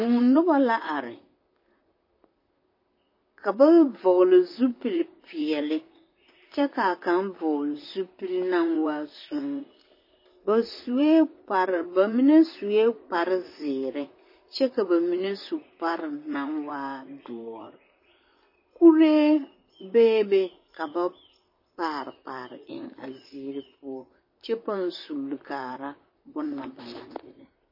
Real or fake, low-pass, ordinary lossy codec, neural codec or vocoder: fake; 5.4 kHz; MP3, 24 kbps; vocoder, 44.1 kHz, 128 mel bands, Pupu-Vocoder